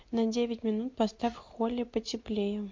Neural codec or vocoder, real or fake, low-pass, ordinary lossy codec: none; real; 7.2 kHz; MP3, 48 kbps